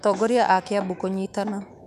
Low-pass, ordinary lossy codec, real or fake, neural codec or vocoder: 14.4 kHz; none; fake; autoencoder, 48 kHz, 128 numbers a frame, DAC-VAE, trained on Japanese speech